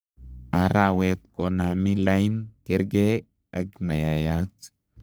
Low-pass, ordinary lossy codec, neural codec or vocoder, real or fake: none; none; codec, 44.1 kHz, 3.4 kbps, Pupu-Codec; fake